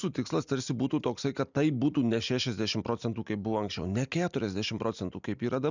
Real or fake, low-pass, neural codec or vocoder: real; 7.2 kHz; none